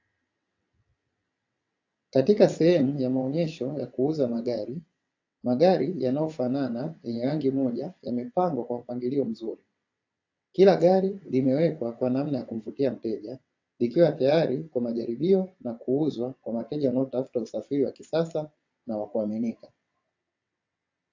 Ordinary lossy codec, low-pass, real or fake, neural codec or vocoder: AAC, 48 kbps; 7.2 kHz; fake; vocoder, 22.05 kHz, 80 mel bands, WaveNeXt